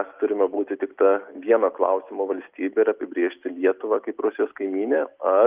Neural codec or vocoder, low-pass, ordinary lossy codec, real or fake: none; 3.6 kHz; Opus, 32 kbps; real